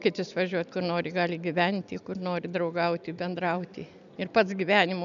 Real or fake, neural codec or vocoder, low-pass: real; none; 7.2 kHz